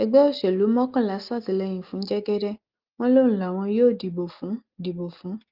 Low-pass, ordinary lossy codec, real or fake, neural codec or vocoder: 5.4 kHz; Opus, 32 kbps; real; none